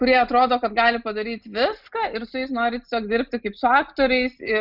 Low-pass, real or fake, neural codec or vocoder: 5.4 kHz; real; none